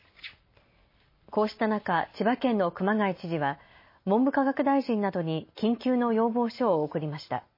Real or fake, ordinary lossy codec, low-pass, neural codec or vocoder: real; MP3, 24 kbps; 5.4 kHz; none